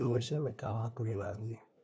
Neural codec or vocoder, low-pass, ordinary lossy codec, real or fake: codec, 16 kHz, 1 kbps, FunCodec, trained on LibriTTS, 50 frames a second; none; none; fake